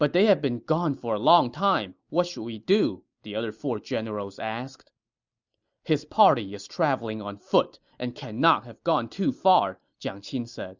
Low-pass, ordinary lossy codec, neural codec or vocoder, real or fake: 7.2 kHz; Opus, 64 kbps; none; real